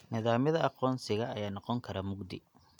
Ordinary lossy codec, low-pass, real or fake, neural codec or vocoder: none; 19.8 kHz; real; none